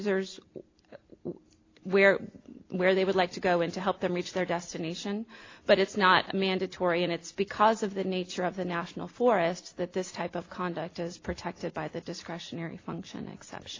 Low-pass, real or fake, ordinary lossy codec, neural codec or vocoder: 7.2 kHz; real; AAC, 32 kbps; none